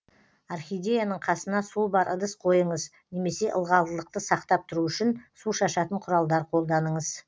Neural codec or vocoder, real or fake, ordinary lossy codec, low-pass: none; real; none; none